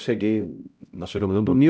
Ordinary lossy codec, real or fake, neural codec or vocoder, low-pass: none; fake; codec, 16 kHz, 0.5 kbps, X-Codec, HuBERT features, trained on LibriSpeech; none